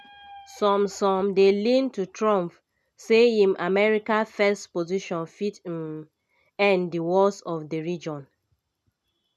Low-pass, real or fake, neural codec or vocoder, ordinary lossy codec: none; real; none; none